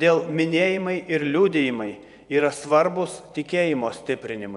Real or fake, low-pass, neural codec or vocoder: fake; 10.8 kHz; vocoder, 24 kHz, 100 mel bands, Vocos